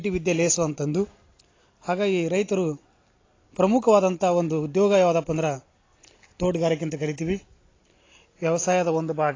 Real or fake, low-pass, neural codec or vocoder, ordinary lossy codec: real; 7.2 kHz; none; AAC, 32 kbps